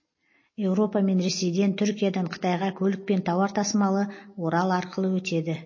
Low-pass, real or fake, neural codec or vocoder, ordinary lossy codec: 7.2 kHz; real; none; MP3, 32 kbps